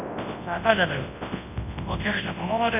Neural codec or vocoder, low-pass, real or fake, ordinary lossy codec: codec, 24 kHz, 0.9 kbps, WavTokenizer, large speech release; 3.6 kHz; fake; none